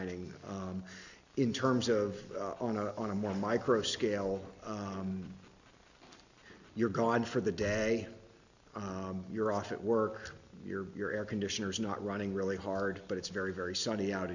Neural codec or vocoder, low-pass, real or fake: none; 7.2 kHz; real